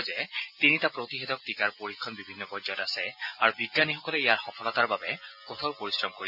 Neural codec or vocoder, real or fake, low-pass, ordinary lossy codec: none; real; 5.4 kHz; none